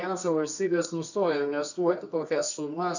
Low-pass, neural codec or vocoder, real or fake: 7.2 kHz; codec, 24 kHz, 0.9 kbps, WavTokenizer, medium music audio release; fake